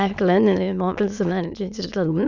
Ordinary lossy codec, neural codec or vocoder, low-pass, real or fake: none; autoencoder, 22.05 kHz, a latent of 192 numbers a frame, VITS, trained on many speakers; 7.2 kHz; fake